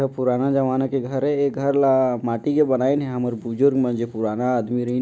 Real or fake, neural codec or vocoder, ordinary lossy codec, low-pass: real; none; none; none